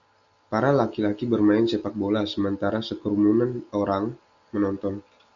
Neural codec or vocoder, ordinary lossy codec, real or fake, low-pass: none; MP3, 96 kbps; real; 7.2 kHz